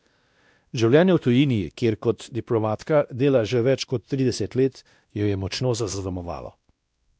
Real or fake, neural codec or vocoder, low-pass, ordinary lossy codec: fake; codec, 16 kHz, 1 kbps, X-Codec, WavLM features, trained on Multilingual LibriSpeech; none; none